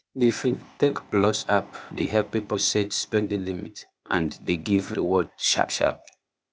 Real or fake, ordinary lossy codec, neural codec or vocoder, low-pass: fake; none; codec, 16 kHz, 0.8 kbps, ZipCodec; none